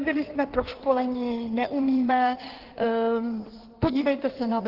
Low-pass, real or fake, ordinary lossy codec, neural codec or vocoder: 5.4 kHz; fake; Opus, 16 kbps; codec, 16 kHz in and 24 kHz out, 1.1 kbps, FireRedTTS-2 codec